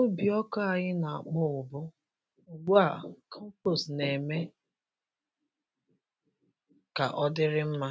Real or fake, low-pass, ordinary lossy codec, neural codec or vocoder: real; none; none; none